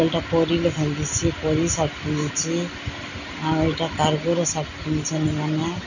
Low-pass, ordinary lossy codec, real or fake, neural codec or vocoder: 7.2 kHz; none; real; none